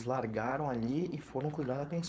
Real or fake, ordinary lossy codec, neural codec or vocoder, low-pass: fake; none; codec, 16 kHz, 4.8 kbps, FACodec; none